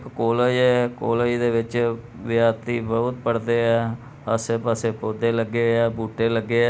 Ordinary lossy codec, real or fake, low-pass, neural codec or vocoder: none; real; none; none